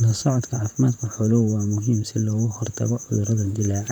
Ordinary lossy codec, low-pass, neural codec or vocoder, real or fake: none; 19.8 kHz; vocoder, 44.1 kHz, 128 mel bands, Pupu-Vocoder; fake